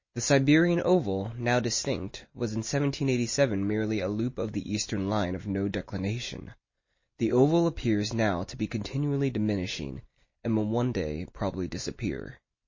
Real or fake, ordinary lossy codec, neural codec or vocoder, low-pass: real; MP3, 32 kbps; none; 7.2 kHz